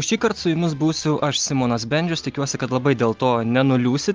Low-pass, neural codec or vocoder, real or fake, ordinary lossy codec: 7.2 kHz; none; real; Opus, 32 kbps